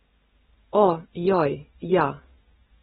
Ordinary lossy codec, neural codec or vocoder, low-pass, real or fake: AAC, 16 kbps; none; 19.8 kHz; real